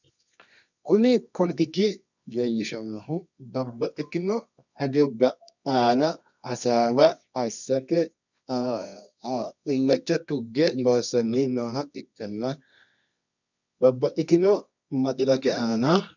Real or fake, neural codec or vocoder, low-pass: fake; codec, 24 kHz, 0.9 kbps, WavTokenizer, medium music audio release; 7.2 kHz